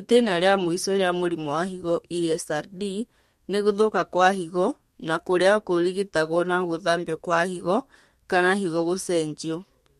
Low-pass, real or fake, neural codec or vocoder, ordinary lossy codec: 14.4 kHz; fake; codec, 32 kHz, 1.9 kbps, SNAC; MP3, 64 kbps